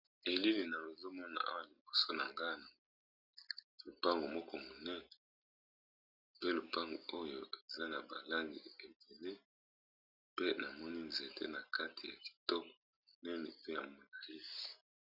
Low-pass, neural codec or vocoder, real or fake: 5.4 kHz; none; real